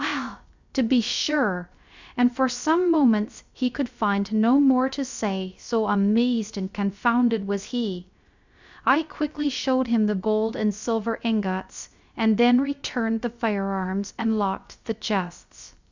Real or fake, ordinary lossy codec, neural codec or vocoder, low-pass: fake; Opus, 64 kbps; codec, 16 kHz, 0.3 kbps, FocalCodec; 7.2 kHz